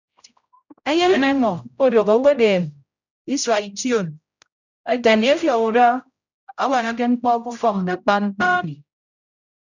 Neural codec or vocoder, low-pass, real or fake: codec, 16 kHz, 0.5 kbps, X-Codec, HuBERT features, trained on general audio; 7.2 kHz; fake